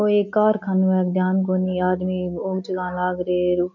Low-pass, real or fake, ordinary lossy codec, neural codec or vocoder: 7.2 kHz; real; none; none